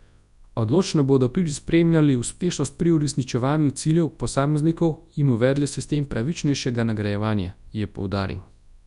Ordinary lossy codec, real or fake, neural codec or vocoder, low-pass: none; fake; codec, 24 kHz, 0.9 kbps, WavTokenizer, large speech release; 10.8 kHz